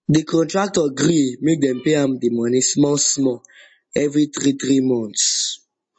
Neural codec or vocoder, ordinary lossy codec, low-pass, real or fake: none; MP3, 32 kbps; 9.9 kHz; real